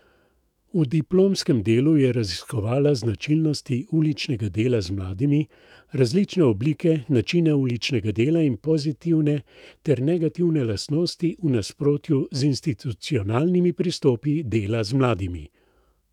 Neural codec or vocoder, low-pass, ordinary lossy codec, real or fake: autoencoder, 48 kHz, 128 numbers a frame, DAC-VAE, trained on Japanese speech; 19.8 kHz; none; fake